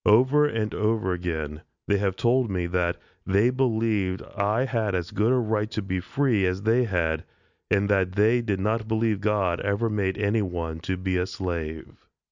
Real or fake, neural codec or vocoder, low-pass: real; none; 7.2 kHz